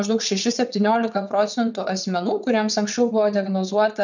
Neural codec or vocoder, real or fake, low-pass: vocoder, 22.05 kHz, 80 mel bands, WaveNeXt; fake; 7.2 kHz